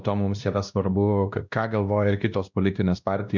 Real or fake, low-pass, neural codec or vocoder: fake; 7.2 kHz; codec, 16 kHz, 1 kbps, X-Codec, WavLM features, trained on Multilingual LibriSpeech